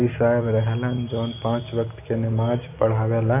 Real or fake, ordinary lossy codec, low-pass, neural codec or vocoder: real; none; 3.6 kHz; none